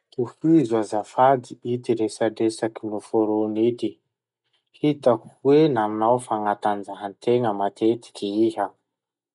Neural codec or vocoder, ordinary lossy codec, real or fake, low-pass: none; none; real; 10.8 kHz